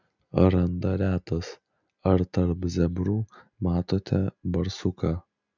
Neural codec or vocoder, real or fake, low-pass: none; real; 7.2 kHz